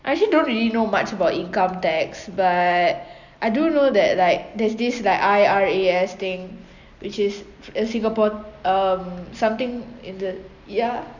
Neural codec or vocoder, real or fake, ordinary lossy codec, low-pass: none; real; none; 7.2 kHz